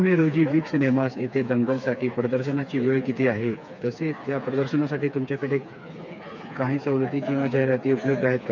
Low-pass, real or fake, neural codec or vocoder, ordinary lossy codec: 7.2 kHz; fake; codec, 16 kHz, 4 kbps, FreqCodec, smaller model; none